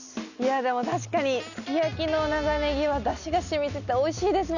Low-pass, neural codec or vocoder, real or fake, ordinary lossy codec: 7.2 kHz; none; real; none